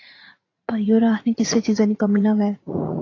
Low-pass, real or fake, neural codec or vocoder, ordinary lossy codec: 7.2 kHz; fake; vocoder, 44.1 kHz, 80 mel bands, Vocos; AAC, 32 kbps